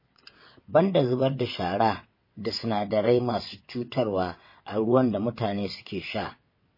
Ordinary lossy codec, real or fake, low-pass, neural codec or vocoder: MP3, 24 kbps; fake; 5.4 kHz; vocoder, 22.05 kHz, 80 mel bands, WaveNeXt